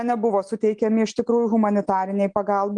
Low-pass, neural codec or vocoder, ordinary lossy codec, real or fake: 9.9 kHz; none; Opus, 24 kbps; real